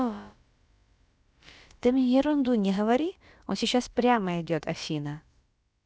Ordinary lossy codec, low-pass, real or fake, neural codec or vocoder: none; none; fake; codec, 16 kHz, about 1 kbps, DyCAST, with the encoder's durations